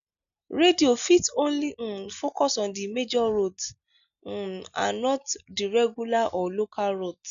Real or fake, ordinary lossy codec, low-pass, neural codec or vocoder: real; MP3, 96 kbps; 7.2 kHz; none